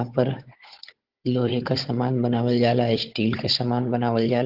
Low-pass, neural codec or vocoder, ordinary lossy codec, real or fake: 5.4 kHz; codec, 16 kHz, 16 kbps, FunCodec, trained on Chinese and English, 50 frames a second; Opus, 16 kbps; fake